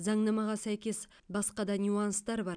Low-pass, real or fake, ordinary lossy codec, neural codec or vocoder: 9.9 kHz; real; none; none